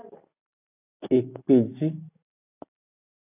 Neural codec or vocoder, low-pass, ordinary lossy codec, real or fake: none; 3.6 kHz; AAC, 32 kbps; real